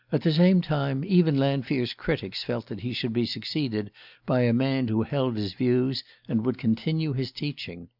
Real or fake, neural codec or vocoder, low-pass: real; none; 5.4 kHz